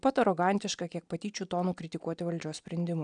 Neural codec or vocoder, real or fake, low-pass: none; real; 9.9 kHz